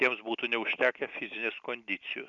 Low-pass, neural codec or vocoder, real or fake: 7.2 kHz; none; real